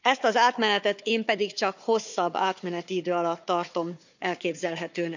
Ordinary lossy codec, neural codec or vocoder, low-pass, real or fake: none; codec, 16 kHz, 4 kbps, FunCodec, trained on Chinese and English, 50 frames a second; 7.2 kHz; fake